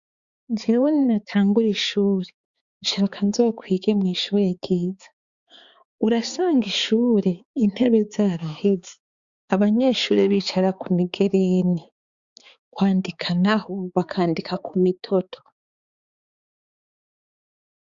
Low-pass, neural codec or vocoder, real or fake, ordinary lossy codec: 7.2 kHz; codec, 16 kHz, 4 kbps, X-Codec, HuBERT features, trained on balanced general audio; fake; Opus, 64 kbps